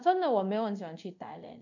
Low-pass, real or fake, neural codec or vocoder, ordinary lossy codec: 7.2 kHz; fake; codec, 24 kHz, 0.5 kbps, DualCodec; none